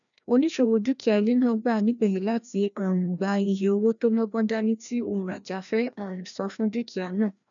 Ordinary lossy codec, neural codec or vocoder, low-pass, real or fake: none; codec, 16 kHz, 1 kbps, FreqCodec, larger model; 7.2 kHz; fake